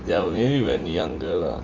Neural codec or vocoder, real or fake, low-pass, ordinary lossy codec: vocoder, 44.1 kHz, 80 mel bands, Vocos; fake; 7.2 kHz; Opus, 32 kbps